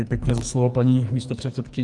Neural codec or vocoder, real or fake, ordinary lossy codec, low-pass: codec, 44.1 kHz, 2.6 kbps, SNAC; fake; Opus, 32 kbps; 10.8 kHz